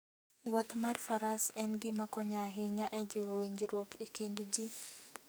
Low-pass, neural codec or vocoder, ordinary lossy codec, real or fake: none; codec, 44.1 kHz, 2.6 kbps, SNAC; none; fake